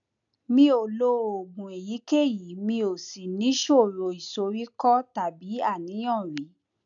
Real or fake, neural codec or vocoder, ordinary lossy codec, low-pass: real; none; none; 7.2 kHz